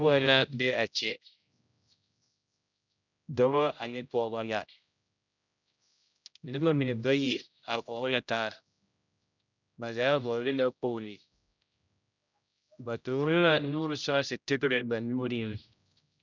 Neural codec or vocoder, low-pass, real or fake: codec, 16 kHz, 0.5 kbps, X-Codec, HuBERT features, trained on general audio; 7.2 kHz; fake